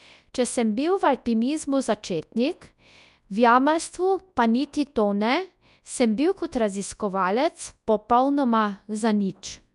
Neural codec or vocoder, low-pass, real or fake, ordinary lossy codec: codec, 24 kHz, 0.9 kbps, WavTokenizer, large speech release; 10.8 kHz; fake; none